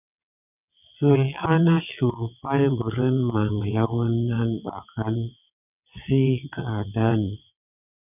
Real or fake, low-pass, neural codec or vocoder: fake; 3.6 kHz; vocoder, 22.05 kHz, 80 mel bands, WaveNeXt